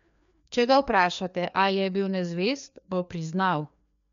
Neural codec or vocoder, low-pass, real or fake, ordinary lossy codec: codec, 16 kHz, 2 kbps, FreqCodec, larger model; 7.2 kHz; fake; MP3, 64 kbps